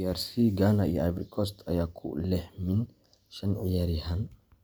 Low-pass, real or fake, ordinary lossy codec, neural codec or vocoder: none; real; none; none